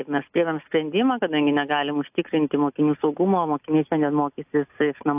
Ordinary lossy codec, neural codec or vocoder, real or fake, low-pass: AAC, 32 kbps; none; real; 3.6 kHz